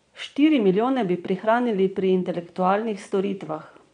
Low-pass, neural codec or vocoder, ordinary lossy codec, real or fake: 9.9 kHz; vocoder, 22.05 kHz, 80 mel bands, Vocos; none; fake